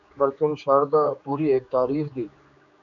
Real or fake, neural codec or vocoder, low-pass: fake; codec, 16 kHz, 4 kbps, X-Codec, HuBERT features, trained on general audio; 7.2 kHz